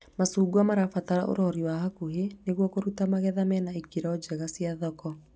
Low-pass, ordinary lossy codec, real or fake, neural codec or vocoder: none; none; real; none